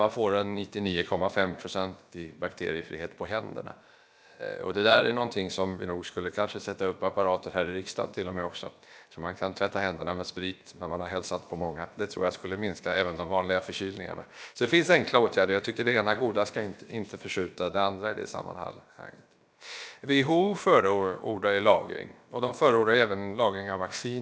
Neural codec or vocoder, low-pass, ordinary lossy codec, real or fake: codec, 16 kHz, about 1 kbps, DyCAST, with the encoder's durations; none; none; fake